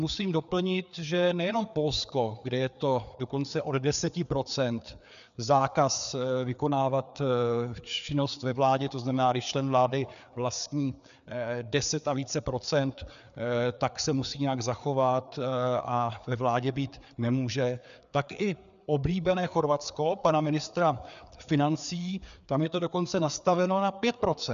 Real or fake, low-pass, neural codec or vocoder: fake; 7.2 kHz; codec, 16 kHz, 4 kbps, FreqCodec, larger model